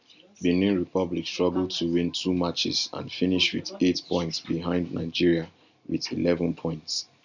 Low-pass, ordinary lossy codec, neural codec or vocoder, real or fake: 7.2 kHz; none; none; real